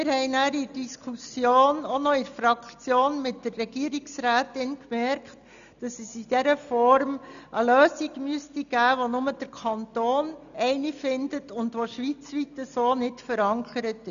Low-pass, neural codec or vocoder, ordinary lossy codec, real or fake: 7.2 kHz; none; none; real